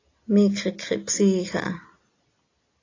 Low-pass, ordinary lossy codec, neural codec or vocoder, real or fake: 7.2 kHz; AAC, 32 kbps; none; real